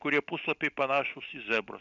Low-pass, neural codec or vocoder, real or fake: 7.2 kHz; none; real